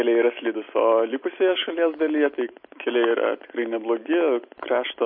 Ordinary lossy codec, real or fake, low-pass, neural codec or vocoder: MP3, 32 kbps; real; 5.4 kHz; none